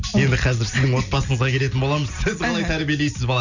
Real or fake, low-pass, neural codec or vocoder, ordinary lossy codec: real; 7.2 kHz; none; none